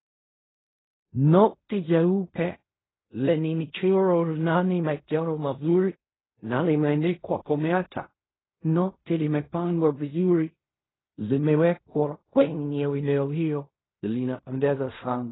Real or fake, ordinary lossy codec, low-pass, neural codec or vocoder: fake; AAC, 16 kbps; 7.2 kHz; codec, 16 kHz in and 24 kHz out, 0.4 kbps, LongCat-Audio-Codec, fine tuned four codebook decoder